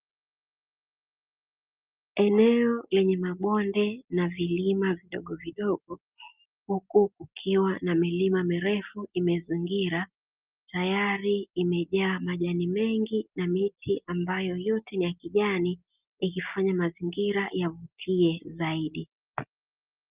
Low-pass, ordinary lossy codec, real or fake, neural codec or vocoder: 3.6 kHz; Opus, 24 kbps; real; none